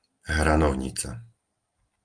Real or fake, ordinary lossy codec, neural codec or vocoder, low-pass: real; Opus, 32 kbps; none; 9.9 kHz